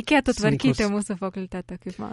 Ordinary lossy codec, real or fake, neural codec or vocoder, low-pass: MP3, 48 kbps; real; none; 19.8 kHz